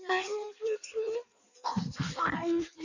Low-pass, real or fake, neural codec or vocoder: 7.2 kHz; fake; codec, 16 kHz in and 24 kHz out, 1.1 kbps, FireRedTTS-2 codec